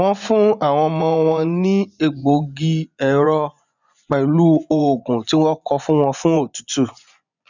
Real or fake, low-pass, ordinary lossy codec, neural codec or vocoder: fake; 7.2 kHz; none; vocoder, 24 kHz, 100 mel bands, Vocos